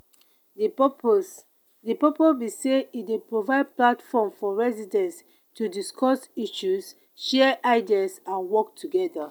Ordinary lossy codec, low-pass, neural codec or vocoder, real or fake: none; none; none; real